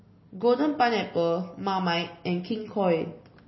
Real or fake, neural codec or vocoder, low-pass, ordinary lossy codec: real; none; 7.2 kHz; MP3, 24 kbps